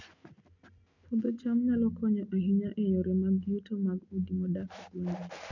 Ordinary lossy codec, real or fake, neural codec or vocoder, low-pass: none; real; none; 7.2 kHz